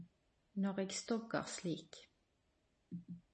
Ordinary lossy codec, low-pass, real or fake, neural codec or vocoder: MP3, 32 kbps; 10.8 kHz; real; none